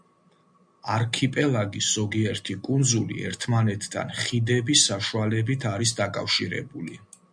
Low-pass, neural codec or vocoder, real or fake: 9.9 kHz; none; real